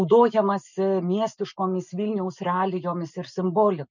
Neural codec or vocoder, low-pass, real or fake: none; 7.2 kHz; real